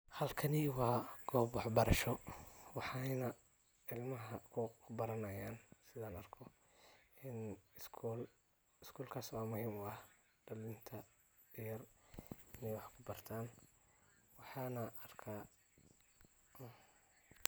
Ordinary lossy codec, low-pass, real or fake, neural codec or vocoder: none; none; real; none